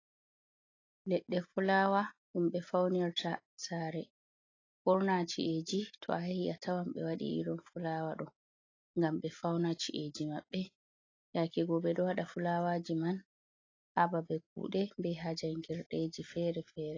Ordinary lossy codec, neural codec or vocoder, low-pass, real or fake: AAC, 32 kbps; none; 7.2 kHz; real